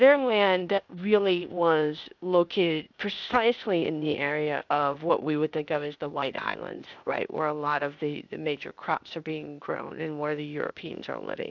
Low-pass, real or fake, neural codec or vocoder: 7.2 kHz; fake; codec, 16 kHz, 0.8 kbps, ZipCodec